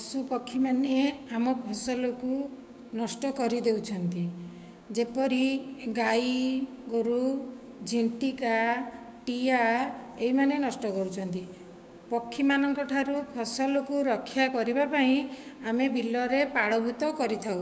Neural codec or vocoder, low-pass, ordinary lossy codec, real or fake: codec, 16 kHz, 6 kbps, DAC; none; none; fake